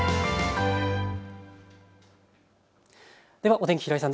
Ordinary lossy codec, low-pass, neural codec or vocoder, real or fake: none; none; none; real